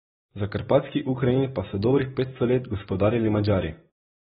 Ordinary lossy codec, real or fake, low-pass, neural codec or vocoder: AAC, 16 kbps; real; 7.2 kHz; none